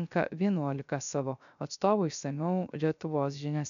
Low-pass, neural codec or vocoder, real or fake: 7.2 kHz; codec, 16 kHz, 0.3 kbps, FocalCodec; fake